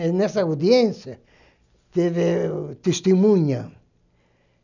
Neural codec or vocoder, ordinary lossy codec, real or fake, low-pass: none; none; real; 7.2 kHz